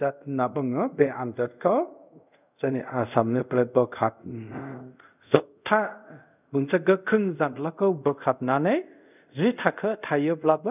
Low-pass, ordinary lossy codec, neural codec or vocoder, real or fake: 3.6 kHz; none; codec, 24 kHz, 0.5 kbps, DualCodec; fake